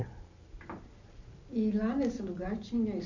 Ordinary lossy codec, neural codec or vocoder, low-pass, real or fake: none; none; 7.2 kHz; real